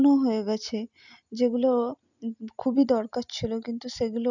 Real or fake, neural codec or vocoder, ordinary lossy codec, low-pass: real; none; none; 7.2 kHz